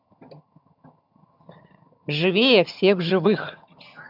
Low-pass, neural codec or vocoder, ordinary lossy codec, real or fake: 5.4 kHz; vocoder, 22.05 kHz, 80 mel bands, HiFi-GAN; none; fake